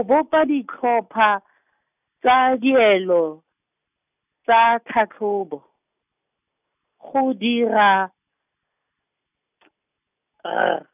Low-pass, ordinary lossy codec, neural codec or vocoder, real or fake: 3.6 kHz; none; none; real